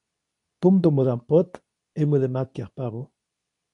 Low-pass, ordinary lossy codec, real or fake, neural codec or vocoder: 10.8 kHz; MP3, 96 kbps; fake; codec, 24 kHz, 0.9 kbps, WavTokenizer, medium speech release version 2